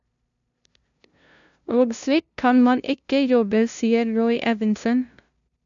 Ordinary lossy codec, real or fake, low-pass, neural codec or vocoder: none; fake; 7.2 kHz; codec, 16 kHz, 0.5 kbps, FunCodec, trained on LibriTTS, 25 frames a second